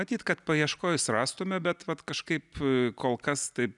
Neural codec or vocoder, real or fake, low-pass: none; real; 10.8 kHz